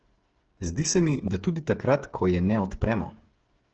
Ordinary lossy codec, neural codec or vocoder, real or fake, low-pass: Opus, 16 kbps; codec, 16 kHz, 8 kbps, FreqCodec, smaller model; fake; 7.2 kHz